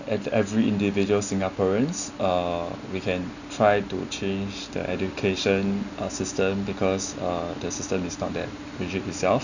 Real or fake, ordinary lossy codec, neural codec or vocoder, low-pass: real; none; none; 7.2 kHz